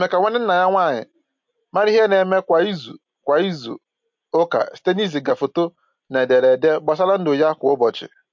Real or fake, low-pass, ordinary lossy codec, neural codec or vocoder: real; 7.2 kHz; MP3, 48 kbps; none